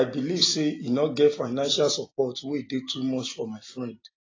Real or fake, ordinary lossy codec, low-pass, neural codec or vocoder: real; AAC, 32 kbps; 7.2 kHz; none